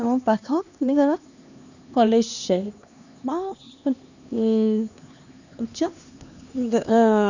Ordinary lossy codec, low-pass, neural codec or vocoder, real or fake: none; 7.2 kHz; codec, 16 kHz, 2 kbps, X-Codec, HuBERT features, trained on LibriSpeech; fake